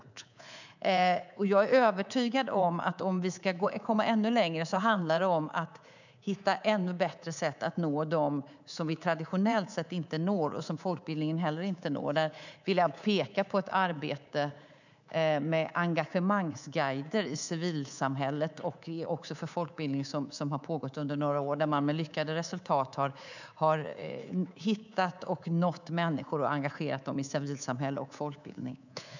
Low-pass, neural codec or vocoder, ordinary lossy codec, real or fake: 7.2 kHz; codec, 24 kHz, 3.1 kbps, DualCodec; none; fake